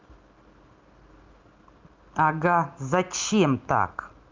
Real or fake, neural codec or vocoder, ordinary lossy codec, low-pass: real; none; Opus, 24 kbps; 7.2 kHz